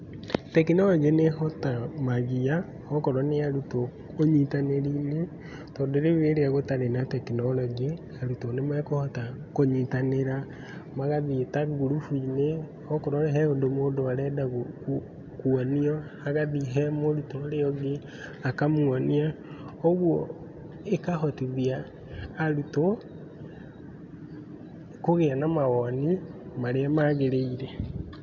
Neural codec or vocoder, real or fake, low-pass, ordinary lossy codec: none; real; 7.2 kHz; none